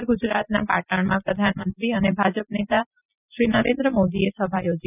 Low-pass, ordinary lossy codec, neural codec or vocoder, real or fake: 3.6 kHz; none; none; real